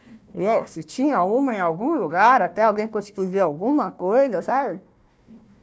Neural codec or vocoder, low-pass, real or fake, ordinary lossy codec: codec, 16 kHz, 1 kbps, FunCodec, trained on Chinese and English, 50 frames a second; none; fake; none